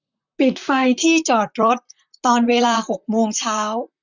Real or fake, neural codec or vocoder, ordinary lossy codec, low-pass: fake; vocoder, 44.1 kHz, 128 mel bands, Pupu-Vocoder; none; 7.2 kHz